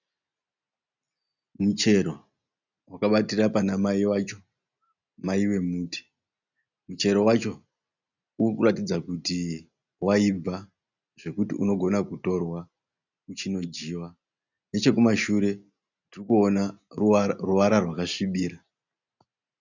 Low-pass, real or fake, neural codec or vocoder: 7.2 kHz; real; none